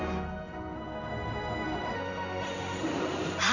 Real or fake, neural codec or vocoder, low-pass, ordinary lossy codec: fake; codec, 16 kHz in and 24 kHz out, 1 kbps, XY-Tokenizer; 7.2 kHz; none